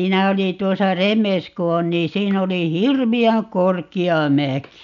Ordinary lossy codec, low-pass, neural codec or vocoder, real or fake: none; 7.2 kHz; none; real